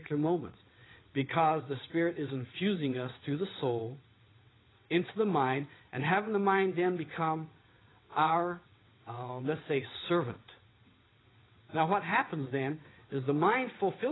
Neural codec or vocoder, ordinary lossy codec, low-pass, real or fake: vocoder, 22.05 kHz, 80 mel bands, WaveNeXt; AAC, 16 kbps; 7.2 kHz; fake